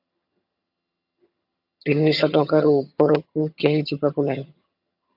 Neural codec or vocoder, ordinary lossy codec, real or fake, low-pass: vocoder, 22.05 kHz, 80 mel bands, HiFi-GAN; AAC, 48 kbps; fake; 5.4 kHz